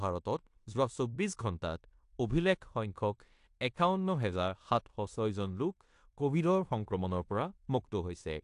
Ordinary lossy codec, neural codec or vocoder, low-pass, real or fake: AAC, 64 kbps; codec, 16 kHz in and 24 kHz out, 0.9 kbps, LongCat-Audio-Codec, fine tuned four codebook decoder; 10.8 kHz; fake